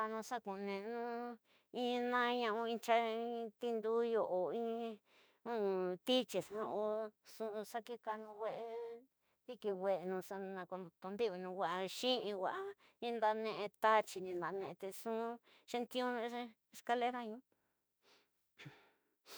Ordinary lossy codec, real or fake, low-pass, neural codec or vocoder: none; fake; none; autoencoder, 48 kHz, 32 numbers a frame, DAC-VAE, trained on Japanese speech